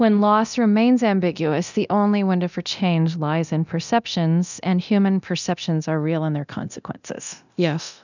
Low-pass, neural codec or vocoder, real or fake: 7.2 kHz; codec, 24 kHz, 0.9 kbps, DualCodec; fake